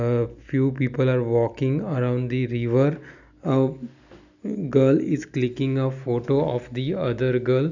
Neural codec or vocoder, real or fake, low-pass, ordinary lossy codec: none; real; 7.2 kHz; none